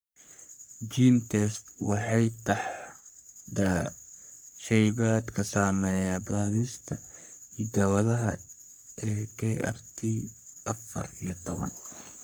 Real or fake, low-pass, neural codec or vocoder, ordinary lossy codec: fake; none; codec, 44.1 kHz, 3.4 kbps, Pupu-Codec; none